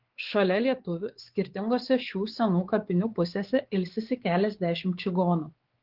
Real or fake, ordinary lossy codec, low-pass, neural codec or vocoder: fake; Opus, 16 kbps; 5.4 kHz; codec, 16 kHz, 4 kbps, X-Codec, WavLM features, trained on Multilingual LibriSpeech